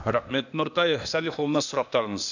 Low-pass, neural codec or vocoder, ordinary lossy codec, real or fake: 7.2 kHz; codec, 16 kHz, 0.8 kbps, ZipCodec; none; fake